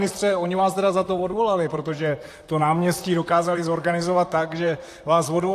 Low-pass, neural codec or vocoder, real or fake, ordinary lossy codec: 14.4 kHz; vocoder, 44.1 kHz, 128 mel bands, Pupu-Vocoder; fake; AAC, 64 kbps